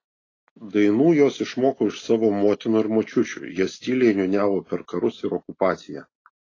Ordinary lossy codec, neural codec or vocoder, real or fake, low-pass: AAC, 32 kbps; none; real; 7.2 kHz